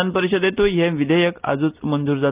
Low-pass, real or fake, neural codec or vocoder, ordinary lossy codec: 3.6 kHz; real; none; Opus, 24 kbps